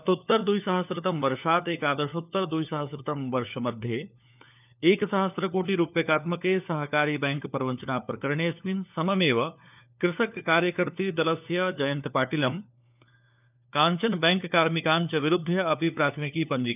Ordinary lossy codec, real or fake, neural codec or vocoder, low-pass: none; fake; codec, 16 kHz, 4 kbps, FunCodec, trained on LibriTTS, 50 frames a second; 3.6 kHz